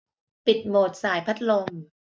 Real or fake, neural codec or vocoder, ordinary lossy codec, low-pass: real; none; none; none